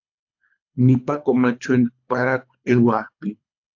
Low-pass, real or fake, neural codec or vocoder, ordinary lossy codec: 7.2 kHz; fake; codec, 24 kHz, 3 kbps, HILCodec; AAC, 48 kbps